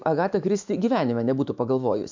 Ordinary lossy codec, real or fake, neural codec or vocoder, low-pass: MP3, 64 kbps; fake; autoencoder, 48 kHz, 128 numbers a frame, DAC-VAE, trained on Japanese speech; 7.2 kHz